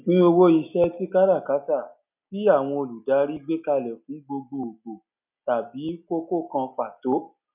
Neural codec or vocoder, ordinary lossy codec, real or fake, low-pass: none; none; real; 3.6 kHz